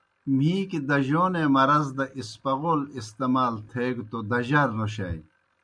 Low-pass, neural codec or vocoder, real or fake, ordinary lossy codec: 9.9 kHz; none; real; MP3, 96 kbps